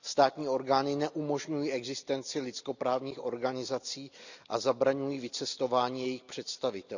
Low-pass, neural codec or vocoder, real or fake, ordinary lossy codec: 7.2 kHz; none; real; none